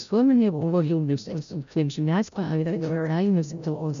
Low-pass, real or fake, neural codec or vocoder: 7.2 kHz; fake; codec, 16 kHz, 0.5 kbps, FreqCodec, larger model